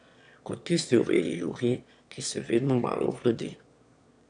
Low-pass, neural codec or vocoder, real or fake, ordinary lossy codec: 9.9 kHz; autoencoder, 22.05 kHz, a latent of 192 numbers a frame, VITS, trained on one speaker; fake; none